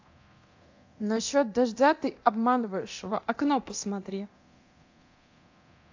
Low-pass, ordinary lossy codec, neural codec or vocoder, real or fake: 7.2 kHz; AAC, 48 kbps; codec, 24 kHz, 0.9 kbps, DualCodec; fake